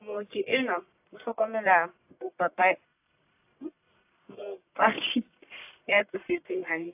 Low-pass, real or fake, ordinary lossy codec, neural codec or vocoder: 3.6 kHz; fake; AAC, 32 kbps; codec, 44.1 kHz, 1.7 kbps, Pupu-Codec